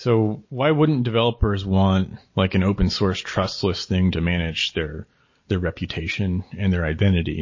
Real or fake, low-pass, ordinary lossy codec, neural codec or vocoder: real; 7.2 kHz; MP3, 32 kbps; none